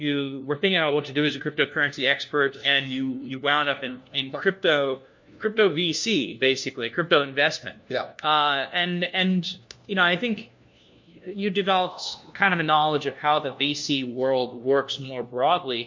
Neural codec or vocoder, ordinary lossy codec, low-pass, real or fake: codec, 16 kHz, 1 kbps, FunCodec, trained on LibriTTS, 50 frames a second; MP3, 48 kbps; 7.2 kHz; fake